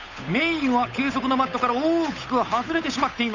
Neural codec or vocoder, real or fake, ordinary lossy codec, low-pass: codec, 16 kHz, 8 kbps, FunCodec, trained on Chinese and English, 25 frames a second; fake; none; 7.2 kHz